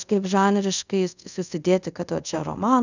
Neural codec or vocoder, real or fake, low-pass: codec, 24 kHz, 0.5 kbps, DualCodec; fake; 7.2 kHz